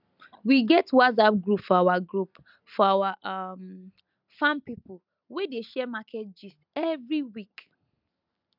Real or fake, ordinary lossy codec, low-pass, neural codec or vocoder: real; none; 5.4 kHz; none